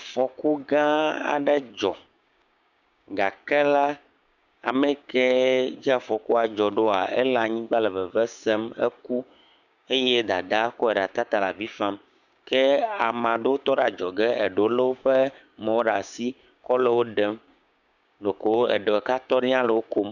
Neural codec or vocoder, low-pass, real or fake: codec, 16 kHz, 6 kbps, DAC; 7.2 kHz; fake